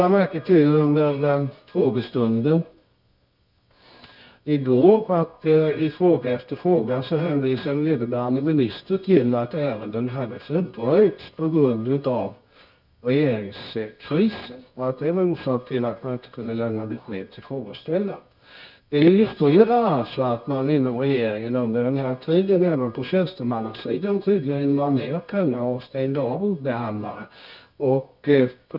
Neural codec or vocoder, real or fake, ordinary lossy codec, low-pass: codec, 24 kHz, 0.9 kbps, WavTokenizer, medium music audio release; fake; none; 5.4 kHz